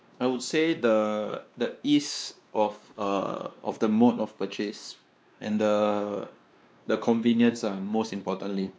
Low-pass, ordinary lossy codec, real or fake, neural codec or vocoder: none; none; fake; codec, 16 kHz, 2 kbps, X-Codec, WavLM features, trained on Multilingual LibriSpeech